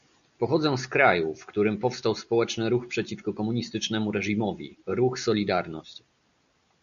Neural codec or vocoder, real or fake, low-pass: none; real; 7.2 kHz